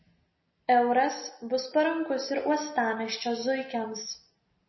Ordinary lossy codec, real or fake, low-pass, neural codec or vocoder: MP3, 24 kbps; real; 7.2 kHz; none